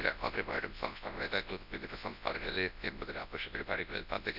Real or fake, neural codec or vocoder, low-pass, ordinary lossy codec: fake; codec, 24 kHz, 0.9 kbps, WavTokenizer, large speech release; 5.4 kHz; none